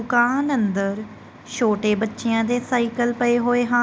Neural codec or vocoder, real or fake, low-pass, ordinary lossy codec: none; real; none; none